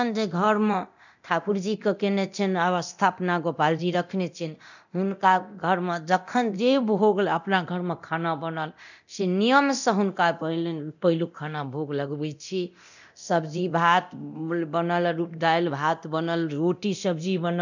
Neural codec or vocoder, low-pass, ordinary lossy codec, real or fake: codec, 24 kHz, 0.9 kbps, DualCodec; 7.2 kHz; none; fake